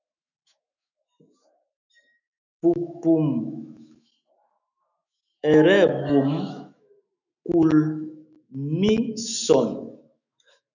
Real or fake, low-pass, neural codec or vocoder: fake; 7.2 kHz; autoencoder, 48 kHz, 128 numbers a frame, DAC-VAE, trained on Japanese speech